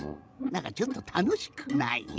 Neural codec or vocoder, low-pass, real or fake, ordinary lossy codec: codec, 16 kHz, 16 kbps, FreqCodec, larger model; none; fake; none